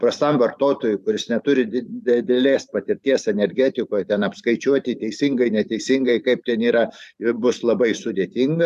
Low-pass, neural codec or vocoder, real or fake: 14.4 kHz; vocoder, 44.1 kHz, 128 mel bands every 512 samples, BigVGAN v2; fake